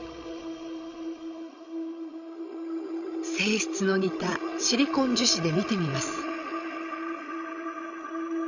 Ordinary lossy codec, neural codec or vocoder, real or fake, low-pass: none; codec, 16 kHz, 16 kbps, FreqCodec, larger model; fake; 7.2 kHz